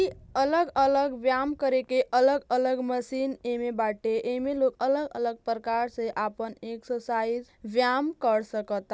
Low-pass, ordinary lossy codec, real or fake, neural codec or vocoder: none; none; real; none